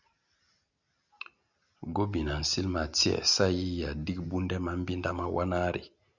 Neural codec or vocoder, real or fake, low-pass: none; real; 7.2 kHz